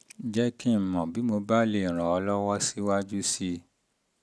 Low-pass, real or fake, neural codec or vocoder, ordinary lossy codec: none; real; none; none